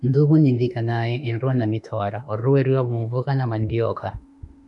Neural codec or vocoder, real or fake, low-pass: autoencoder, 48 kHz, 32 numbers a frame, DAC-VAE, trained on Japanese speech; fake; 10.8 kHz